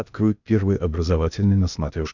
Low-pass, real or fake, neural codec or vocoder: 7.2 kHz; fake; codec, 16 kHz, 0.8 kbps, ZipCodec